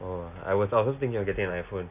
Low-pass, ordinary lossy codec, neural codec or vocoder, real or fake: 3.6 kHz; none; none; real